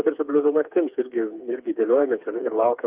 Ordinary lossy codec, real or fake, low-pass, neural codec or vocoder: Opus, 24 kbps; fake; 3.6 kHz; codec, 16 kHz, 4 kbps, FreqCodec, smaller model